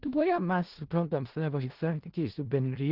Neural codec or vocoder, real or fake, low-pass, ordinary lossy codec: codec, 16 kHz in and 24 kHz out, 0.4 kbps, LongCat-Audio-Codec, four codebook decoder; fake; 5.4 kHz; Opus, 16 kbps